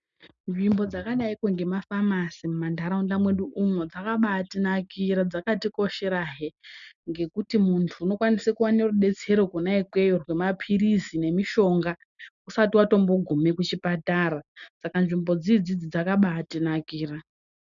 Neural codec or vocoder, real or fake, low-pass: none; real; 7.2 kHz